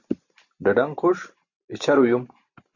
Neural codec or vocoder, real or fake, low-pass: none; real; 7.2 kHz